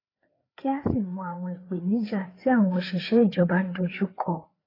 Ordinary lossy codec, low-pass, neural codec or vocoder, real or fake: AAC, 24 kbps; 5.4 kHz; codec, 16 kHz, 4 kbps, FreqCodec, larger model; fake